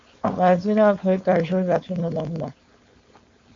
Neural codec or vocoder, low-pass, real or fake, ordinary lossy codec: codec, 16 kHz, 4.8 kbps, FACodec; 7.2 kHz; fake; MP3, 48 kbps